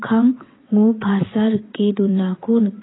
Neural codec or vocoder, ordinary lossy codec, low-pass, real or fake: vocoder, 22.05 kHz, 80 mel bands, WaveNeXt; AAC, 16 kbps; 7.2 kHz; fake